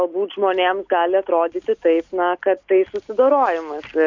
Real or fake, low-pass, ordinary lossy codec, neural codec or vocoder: real; 7.2 kHz; MP3, 64 kbps; none